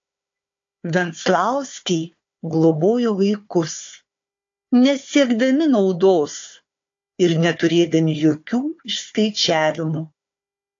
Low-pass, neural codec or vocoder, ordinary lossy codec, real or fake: 7.2 kHz; codec, 16 kHz, 4 kbps, FunCodec, trained on Chinese and English, 50 frames a second; AAC, 48 kbps; fake